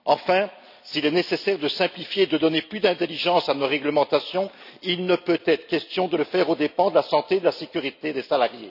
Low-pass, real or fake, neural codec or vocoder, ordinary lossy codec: 5.4 kHz; real; none; none